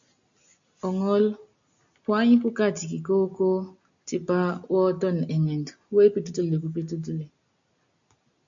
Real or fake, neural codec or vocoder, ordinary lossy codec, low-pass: real; none; MP3, 64 kbps; 7.2 kHz